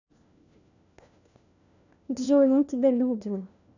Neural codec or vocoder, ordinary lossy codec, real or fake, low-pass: codec, 16 kHz, 1 kbps, FunCodec, trained on LibriTTS, 50 frames a second; none; fake; 7.2 kHz